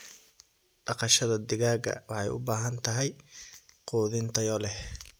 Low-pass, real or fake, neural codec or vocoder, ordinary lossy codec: none; real; none; none